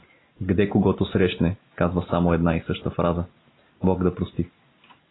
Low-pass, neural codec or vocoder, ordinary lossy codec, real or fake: 7.2 kHz; none; AAC, 16 kbps; real